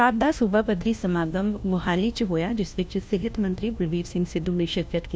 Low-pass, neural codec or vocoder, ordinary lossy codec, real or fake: none; codec, 16 kHz, 1 kbps, FunCodec, trained on LibriTTS, 50 frames a second; none; fake